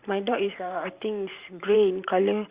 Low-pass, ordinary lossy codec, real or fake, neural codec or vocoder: 3.6 kHz; Opus, 64 kbps; fake; vocoder, 44.1 kHz, 128 mel bands every 512 samples, BigVGAN v2